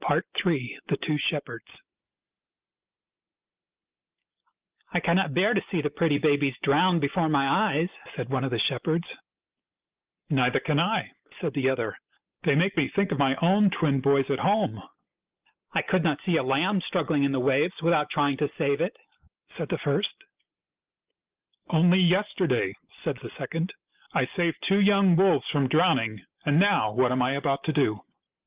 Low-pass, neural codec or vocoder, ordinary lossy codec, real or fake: 3.6 kHz; none; Opus, 32 kbps; real